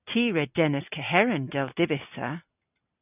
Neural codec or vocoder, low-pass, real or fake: none; 3.6 kHz; real